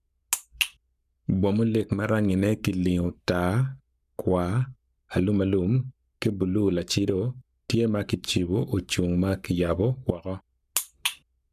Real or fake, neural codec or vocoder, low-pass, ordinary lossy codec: fake; codec, 44.1 kHz, 7.8 kbps, Pupu-Codec; 14.4 kHz; none